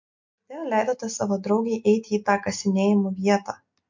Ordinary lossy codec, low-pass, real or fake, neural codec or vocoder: MP3, 48 kbps; 7.2 kHz; real; none